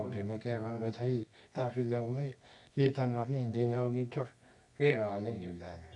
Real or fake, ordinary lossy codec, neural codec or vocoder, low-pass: fake; none; codec, 24 kHz, 0.9 kbps, WavTokenizer, medium music audio release; 10.8 kHz